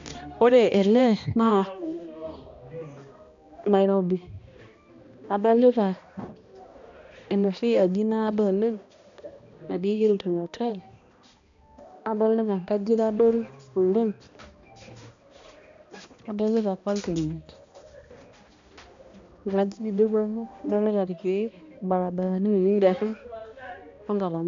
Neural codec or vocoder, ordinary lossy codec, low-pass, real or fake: codec, 16 kHz, 1 kbps, X-Codec, HuBERT features, trained on balanced general audio; MP3, 64 kbps; 7.2 kHz; fake